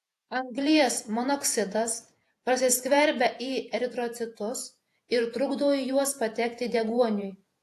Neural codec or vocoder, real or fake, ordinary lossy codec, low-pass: vocoder, 48 kHz, 128 mel bands, Vocos; fake; AAC, 64 kbps; 14.4 kHz